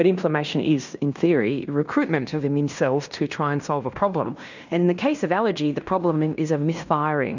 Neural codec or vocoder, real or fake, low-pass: codec, 16 kHz in and 24 kHz out, 0.9 kbps, LongCat-Audio-Codec, fine tuned four codebook decoder; fake; 7.2 kHz